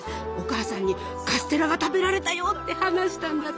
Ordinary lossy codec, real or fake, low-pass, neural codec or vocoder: none; real; none; none